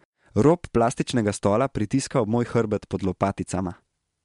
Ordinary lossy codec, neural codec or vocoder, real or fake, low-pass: MP3, 64 kbps; none; real; 10.8 kHz